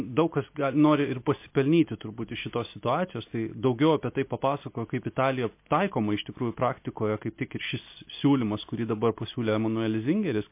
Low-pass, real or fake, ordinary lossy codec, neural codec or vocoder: 3.6 kHz; real; MP3, 24 kbps; none